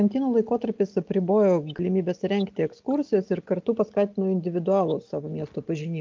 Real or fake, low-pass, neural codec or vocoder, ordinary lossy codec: real; 7.2 kHz; none; Opus, 24 kbps